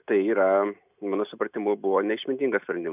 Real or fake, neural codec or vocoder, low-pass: real; none; 3.6 kHz